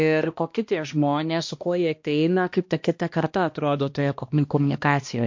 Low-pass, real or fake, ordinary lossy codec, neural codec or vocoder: 7.2 kHz; fake; MP3, 48 kbps; codec, 16 kHz, 1 kbps, X-Codec, HuBERT features, trained on balanced general audio